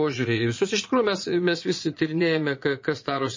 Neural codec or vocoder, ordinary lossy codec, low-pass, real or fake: vocoder, 22.05 kHz, 80 mel bands, Vocos; MP3, 32 kbps; 7.2 kHz; fake